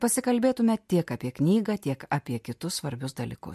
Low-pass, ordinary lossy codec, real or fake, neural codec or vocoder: 14.4 kHz; MP3, 64 kbps; real; none